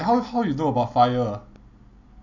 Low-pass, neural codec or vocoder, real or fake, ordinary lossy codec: 7.2 kHz; none; real; none